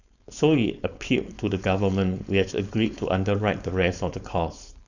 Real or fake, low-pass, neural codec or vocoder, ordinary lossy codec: fake; 7.2 kHz; codec, 16 kHz, 4.8 kbps, FACodec; none